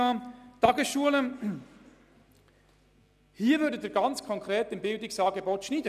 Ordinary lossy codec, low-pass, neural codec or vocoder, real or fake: none; 14.4 kHz; none; real